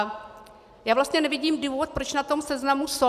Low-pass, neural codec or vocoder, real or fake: 14.4 kHz; none; real